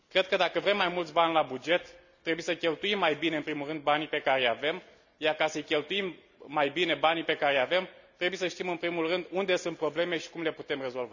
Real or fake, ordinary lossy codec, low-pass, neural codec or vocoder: real; none; 7.2 kHz; none